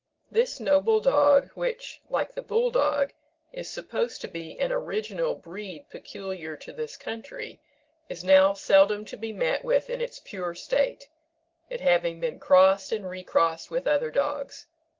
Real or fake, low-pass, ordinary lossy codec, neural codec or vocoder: real; 7.2 kHz; Opus, 16 kbps; none